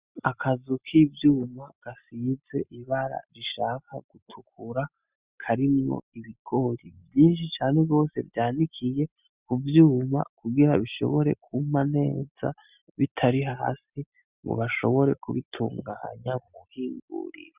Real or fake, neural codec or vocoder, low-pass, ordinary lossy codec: real; none; 3.6 kHz; Opus, 64 kbps